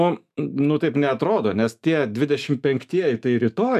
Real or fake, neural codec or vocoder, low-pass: fake; autoencoder, 48 kHz, 128 numbers a frame, DAC-VAE, trained on Japanese speech; 14.4 kHz